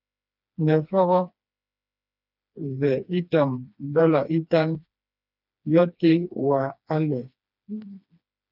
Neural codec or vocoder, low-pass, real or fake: codec, 16 kHz, 2 kbps, FreqCodec, smaller model; 5.4 kHz; fake